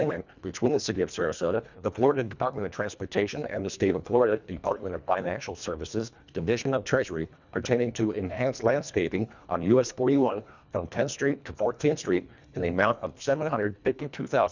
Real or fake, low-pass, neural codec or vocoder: fake; 7.2 kHz; codec, 24 kHz, 1.5 kbps, HILCodec